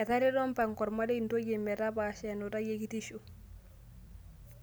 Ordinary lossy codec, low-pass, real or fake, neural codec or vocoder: none; none; real; none